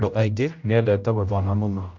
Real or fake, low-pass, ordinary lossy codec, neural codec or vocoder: fake; 7.2 kHz; none; codec, 16 kHz, 0.5 kbps, X-Codec, HuBERT features, trained on general audio